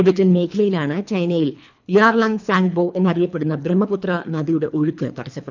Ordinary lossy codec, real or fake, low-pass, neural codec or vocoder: none; fake; 7.2 kHz; codec, 24 kHz, 3 kbps, HILCodec